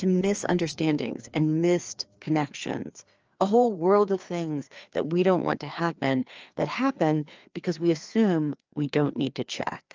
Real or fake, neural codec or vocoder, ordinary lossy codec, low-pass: fake; codec, 16 kHz, 2 kbps, FreqCodec, larger model; Opus, 24 kbps; 7.2 kHz